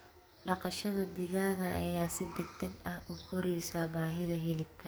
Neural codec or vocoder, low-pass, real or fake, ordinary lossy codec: codec, 44.1 kHz, 2.6 kbps, SNAC; none; fake; none